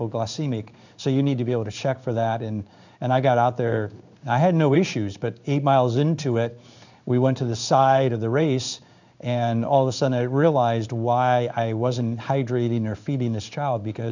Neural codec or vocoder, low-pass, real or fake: codec, 16 kHz in and 24 kHz out, 1 kbps, XY-Tokenizer; 7.2 kHz; fake